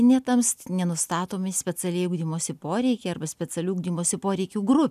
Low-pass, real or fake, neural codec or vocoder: 14.4 kHz; real; none